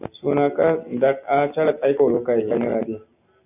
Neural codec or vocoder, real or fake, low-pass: none; real; 3.6 kHz